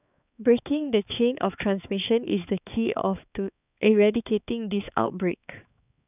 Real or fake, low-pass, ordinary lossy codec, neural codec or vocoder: fake; 3.6 kHz; none; codec, 16 kHz, 4 kbps, X-Codec, HuBERT features, trained on balanced general audio